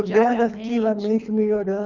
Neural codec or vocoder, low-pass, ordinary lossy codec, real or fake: codec, 24 kHz, 3 kbps, HILCodec; 7.2 kHz; none; fake